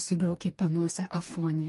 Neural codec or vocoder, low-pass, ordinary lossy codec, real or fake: codec, 44.1 kHz, 2.6 kbps, DAC; 14.4 kHz; MP3, 48 kbps; fake